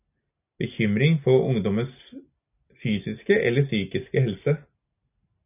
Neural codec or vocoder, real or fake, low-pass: none; real; 3.6 kHz